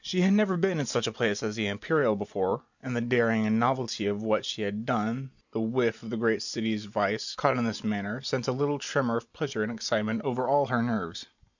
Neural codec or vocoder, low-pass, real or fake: none; 7.2 kHz; real